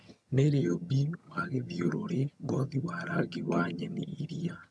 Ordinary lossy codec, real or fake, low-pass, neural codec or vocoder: none; fake; none; vocoder, 22.05 kHz, 80 mel bands, HiFi-GAN